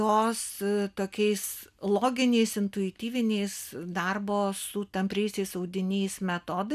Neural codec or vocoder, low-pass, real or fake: none; 14.4 kHz; real